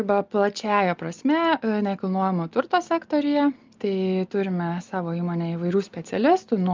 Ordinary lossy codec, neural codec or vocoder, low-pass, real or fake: Opus, 24 kbps; none; 7.2 kHz; real